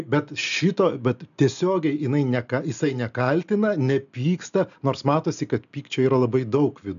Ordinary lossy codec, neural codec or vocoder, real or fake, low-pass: MP3, 96 kbps; none; real; 7.2 kHz